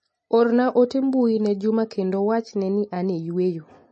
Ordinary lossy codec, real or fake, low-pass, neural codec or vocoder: MP3, 32 kbps; real; 10.8 kHz; none